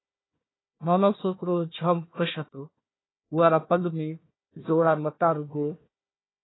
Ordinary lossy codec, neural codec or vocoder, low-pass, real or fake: AAC, 16 kbps; codec, 16 kHz, 1 kbps, FunCodec, trained on Chinese and English, 50 frames a second; 7.2 kHz; fake